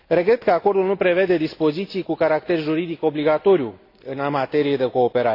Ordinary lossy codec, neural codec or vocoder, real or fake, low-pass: AAC, 32 kbps; none; real; 5.4 kHz